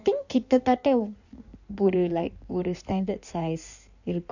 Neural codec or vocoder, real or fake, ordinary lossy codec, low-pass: codec, 16 kHz in and 24 kHz out, 1.1 kbps, FireRedTTS-2 codec; fake; none; 7.2 kHz